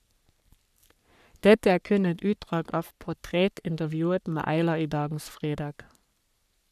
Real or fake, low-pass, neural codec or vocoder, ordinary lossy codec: fake; 14.4 kHz; codec, 44.1 kHz, 3.4 kbps, Pupu-Codec; none